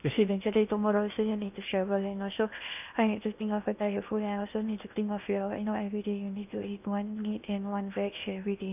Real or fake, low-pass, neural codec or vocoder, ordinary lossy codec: fake; 3.6 kHz; codec, 16 kHz in and 24 kHz out, 0.8 kbps, FocalCodec, streaming, 65536 codes; none